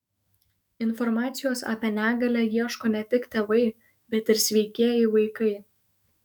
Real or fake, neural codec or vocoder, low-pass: fake; autoencoder, 48 kHz, 128 numbers a frame, DAC-VAE, trained on Japanese speech; 19.8 kHz